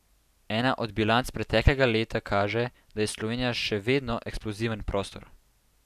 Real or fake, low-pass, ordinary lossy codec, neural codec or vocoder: real; 14.4 kHz; none; none